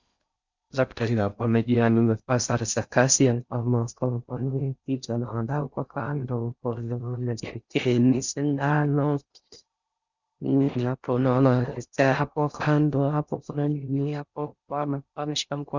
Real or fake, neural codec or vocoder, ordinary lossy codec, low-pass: fake; codec, 16 kHz in and 24 kHz out, 0.6 kbps, FocalCodec, streaming, 4096 codes; Opus, 64 kbps; 7.2 kHz